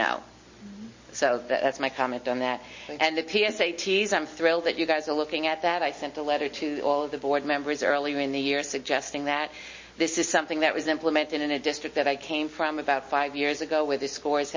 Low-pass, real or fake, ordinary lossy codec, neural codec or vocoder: 7.2 kHz; real; MP3, 32 kbps; none